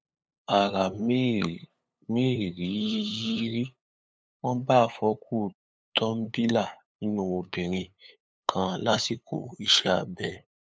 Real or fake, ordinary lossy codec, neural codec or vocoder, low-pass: fake; none; codec, 16 kHz, 8 kbps, FunCodec, trained on LibriTTS, 25 frames a second; none